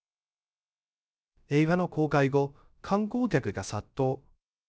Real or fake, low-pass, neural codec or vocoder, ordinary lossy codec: fake; none; codec, 16 kHz, 0.3 kbps, FocalCodec; none